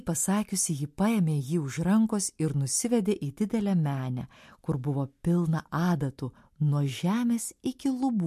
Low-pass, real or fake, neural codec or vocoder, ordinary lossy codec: 14.4 kHz; real; none; MP3, 64 kbps